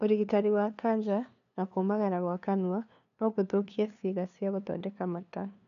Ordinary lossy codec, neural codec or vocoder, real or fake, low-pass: none; codec, 16 kHz, 2 kbps, FunCodec, trained on LibriTTS, 25 frames a second; fake; 7.2 kHz